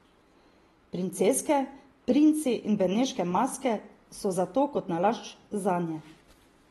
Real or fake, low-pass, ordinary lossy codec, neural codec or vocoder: real; 19.8 kHz; AAC, 32 kbps; none